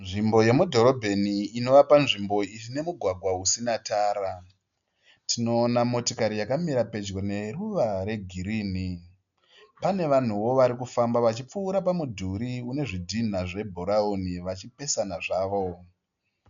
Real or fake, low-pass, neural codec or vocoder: real; 7.2 kHz; none